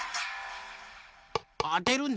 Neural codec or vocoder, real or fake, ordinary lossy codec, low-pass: none; real; none; none